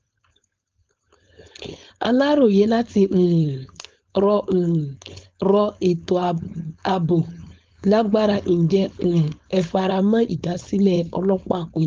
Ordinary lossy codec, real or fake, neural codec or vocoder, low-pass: Opus, 24 kbps; fake; codec, 16 kHz, 4.8 kbps, FACodec; 7.2 kHz